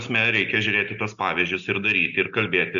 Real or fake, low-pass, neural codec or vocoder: real; 7.2 kHz; none